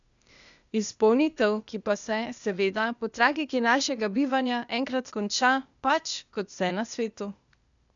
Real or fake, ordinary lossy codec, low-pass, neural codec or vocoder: fake; none; 7.2 kHz; codec, 16 kHz, 0.8 kbps, ZipCodec